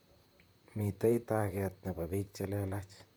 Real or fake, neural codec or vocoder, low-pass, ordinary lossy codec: fake; vocoder, 44.1 kHz, 128 mel bands, Pupu-Vocoder; none; none